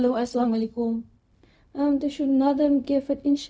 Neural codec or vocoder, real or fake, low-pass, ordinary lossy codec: codec, 16 kHz, 0.4 kbps, LongCat-Audio-Codec; fake; none; none